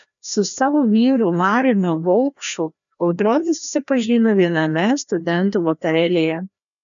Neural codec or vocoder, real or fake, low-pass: codec, 16 kHz, 1 kbps, FreqCodec, larger model; fake; 7.2 kHz